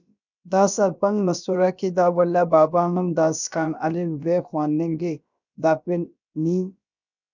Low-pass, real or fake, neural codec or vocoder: 7.2 kHz; fake; codec, 16 kHz, about 1 kbps, DyCAST, with the encoder's durations